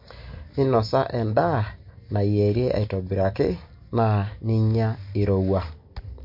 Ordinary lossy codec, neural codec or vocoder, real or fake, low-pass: MP3, 32 kbps; none; real; 5.4 kHz